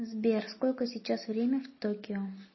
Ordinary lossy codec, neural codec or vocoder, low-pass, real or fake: MP3, 24 kbps; none; 7.2 kHz; real